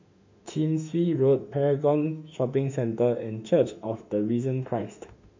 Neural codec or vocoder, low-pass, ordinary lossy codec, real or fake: autoencoder, 48 kHz, 32 numbers a frame, DAC-VAE, trained on Japanese speech; 7.2 kHz; MP3, 64 kbps; fake